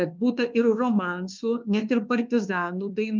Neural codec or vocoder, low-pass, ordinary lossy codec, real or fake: codec, 24 kHz, 1.2 kbps, DualCodec; 7.2 kHz; Opus, 24 kbps; fake